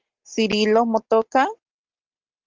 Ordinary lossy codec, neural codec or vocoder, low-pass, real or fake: Opus, 16 kbps; none; 7.2 kHz; real